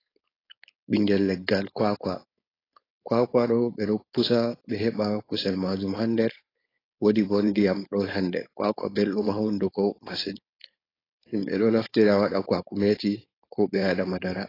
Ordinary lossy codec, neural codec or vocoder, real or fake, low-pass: AAC, 24 kbps; codec, 16 kHz, 4.8 kbps, FACodec; fake; 5.4 kHz